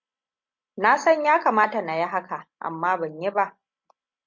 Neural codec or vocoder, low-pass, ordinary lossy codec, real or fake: none; 7.2 kHz; MP3, 48 kbps; real